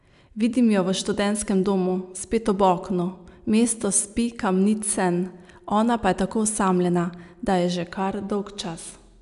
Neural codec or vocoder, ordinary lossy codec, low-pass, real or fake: none; none; 10.8 kHz; real